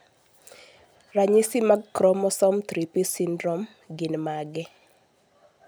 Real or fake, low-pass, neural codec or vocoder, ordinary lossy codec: real; none; none; none